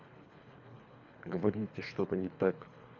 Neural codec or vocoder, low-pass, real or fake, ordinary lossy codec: codec, 24 kHz, 3 kbps, HILCodec; 7.2 kHz; fake; none